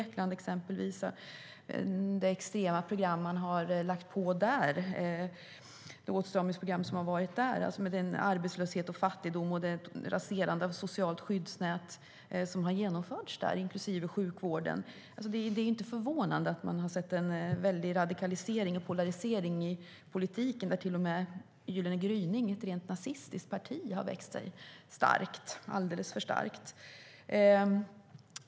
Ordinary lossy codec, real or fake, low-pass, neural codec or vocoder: none; real; none; none